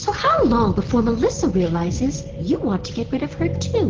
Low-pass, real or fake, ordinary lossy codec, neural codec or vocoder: 7.2 kHz; real; Opus, 16 kbps; none